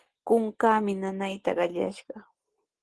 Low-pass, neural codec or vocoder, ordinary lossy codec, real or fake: 10.8 kHz; none; Opus, 16 kbps; real